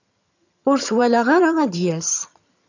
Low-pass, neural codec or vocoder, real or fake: 7.2 kHz; vocoder, 22.05 kHz, 80 mel bands, HiFi-GAN; fake